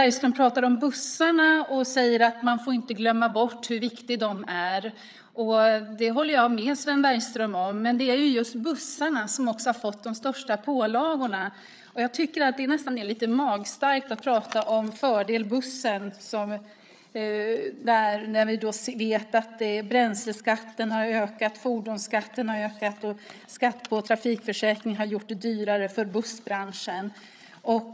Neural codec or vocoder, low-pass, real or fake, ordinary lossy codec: codec, 16 kHz, 8 kbps, FreqCodec, larger model; none; fake; none